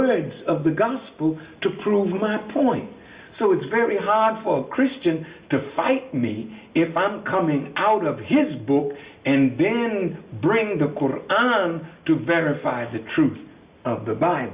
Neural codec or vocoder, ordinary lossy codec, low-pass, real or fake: none; Opus, 64 kbps; 3.6 kHz; real